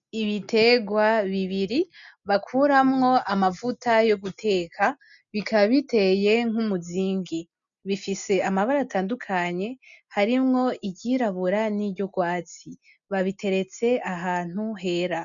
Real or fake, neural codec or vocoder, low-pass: real; none; 7.2 kHz